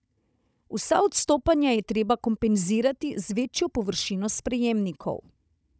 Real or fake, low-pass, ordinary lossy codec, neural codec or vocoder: fake; none; none; codec, 16 kHz, 16 kbps, FunCodec, trained on Chinese and English, 50 frames a second